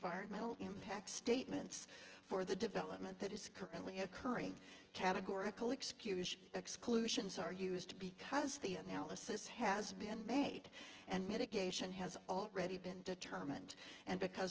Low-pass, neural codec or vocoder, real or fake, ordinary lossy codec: 7.2 kHz; vocoder, 24 kHz, 100 mel bands, Vocos; fake; Opus, 16 kbps